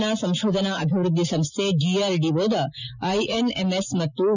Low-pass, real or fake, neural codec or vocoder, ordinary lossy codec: 7.2 kHz; real; none; none